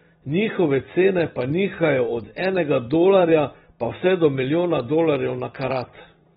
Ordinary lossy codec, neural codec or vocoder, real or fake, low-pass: AAC, 16 kbps; vocoder, 44.1 kHz, 128 mel bands every 256 samples, BigVGAN v2; fake; 19.8 kHz